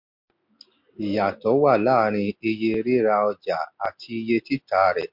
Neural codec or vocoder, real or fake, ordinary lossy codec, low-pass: none; real; MP3, 48 kbps; 5.4 kHz